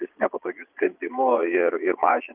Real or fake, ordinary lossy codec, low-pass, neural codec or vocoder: fake; Opus, 32 kbps; 3.6 kHz; vocoder, 22.05 kHz, 80 mel bands, Vocos